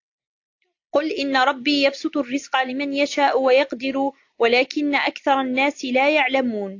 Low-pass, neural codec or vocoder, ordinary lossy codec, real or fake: 7.2 kHz; none; AAC, 48 kbps; real